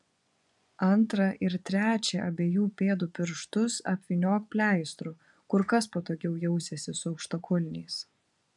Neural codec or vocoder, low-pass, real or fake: vocoder, 24 kHz, 100 mel bands, Vocos; 10.8 kHz; fake